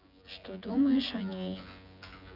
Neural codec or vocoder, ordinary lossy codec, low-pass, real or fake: vocoder, 24 kHz, 100 mel bands, Vocos; none; 5.4 kHz; fake